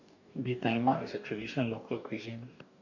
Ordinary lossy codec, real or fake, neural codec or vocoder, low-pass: none; fake; codec, 44.1 kHz, 2.6 kbps, DAC; 7.2 kHz